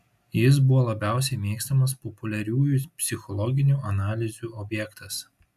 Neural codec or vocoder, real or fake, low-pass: none; real; 14.4 kHz